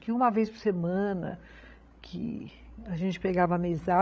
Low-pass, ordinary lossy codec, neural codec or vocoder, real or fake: none; none; codec, 16 kHz, 16 kbps, FreqCodec, larger model; fake